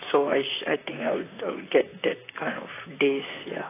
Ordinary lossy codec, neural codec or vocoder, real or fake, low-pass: AAC, 16 kbps; vocoder, 44.1 kHz, 128 mel bands, Pupu-Vocoder; fake; 3.6 kHz